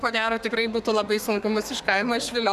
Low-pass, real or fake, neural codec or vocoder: 14.4 kHz; fake; codec, 32 kHz, 1.9 kbps, SNAC